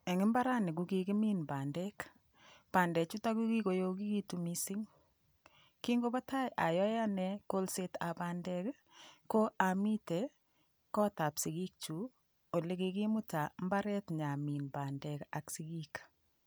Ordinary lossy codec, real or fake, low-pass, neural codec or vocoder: none; real; none; none